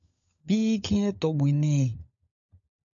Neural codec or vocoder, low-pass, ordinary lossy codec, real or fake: codec, 16 kHz, 16 kbps, FunCodec, trained on LibriTTS, 50 frames a second; 7.2 kHz; AAC, 64 kbps; fake